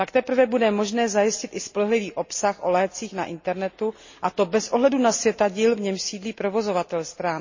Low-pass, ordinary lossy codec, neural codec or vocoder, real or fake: 7.2 kHz; MP3, 32 kbps; none; real